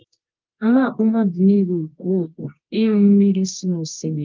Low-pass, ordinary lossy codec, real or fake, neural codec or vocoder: 7.2 kHz; Opus, 32 kbps; fake; codec, 24 kHz, 0.9 kbps, WavTokenizer, medium music audio release